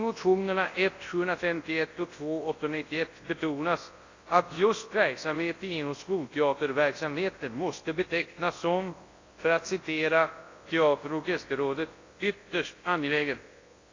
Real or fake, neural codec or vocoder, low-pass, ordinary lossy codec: fake; codec, 24 kHz, 0.9 kbps, WavTokenizer, large speech release; 7.2 kHz; AAC, 32 kbps